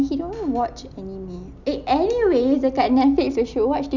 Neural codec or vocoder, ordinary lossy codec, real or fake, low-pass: none; none; real; 7.2 kHz